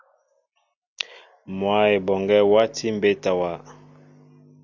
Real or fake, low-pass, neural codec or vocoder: real; 7.2 kHz; none